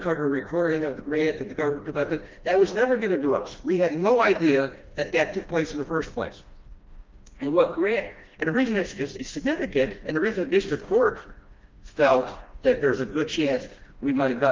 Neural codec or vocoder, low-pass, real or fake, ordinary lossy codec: codec, 16 kHz, 1 kbps, FreqCodec, smaller model; 7.2 kHz; fake; Opus, 24 kbps